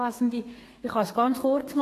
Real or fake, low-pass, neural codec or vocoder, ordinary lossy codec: fake; 14.4 kHz; codec, 32 kHz, 1.9 kbps, SNAC; AAC, 48 kbps